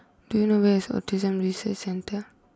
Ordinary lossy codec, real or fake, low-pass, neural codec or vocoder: none; real; none; none